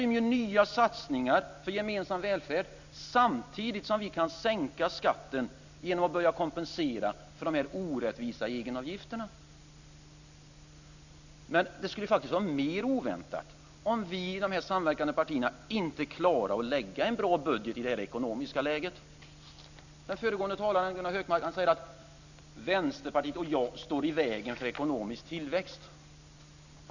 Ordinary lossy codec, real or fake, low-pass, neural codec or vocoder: none; real; 7.2 kHz; none